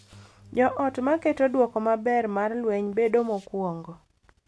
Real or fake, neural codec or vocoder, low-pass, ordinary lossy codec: real; none; none; none